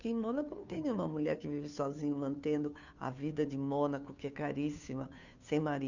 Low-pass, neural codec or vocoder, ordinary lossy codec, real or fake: 7.2 kHz; codec, 16 kHz, 2 kbps, FunCodec, trained on Chinese and English, 25 frames a second; none; fake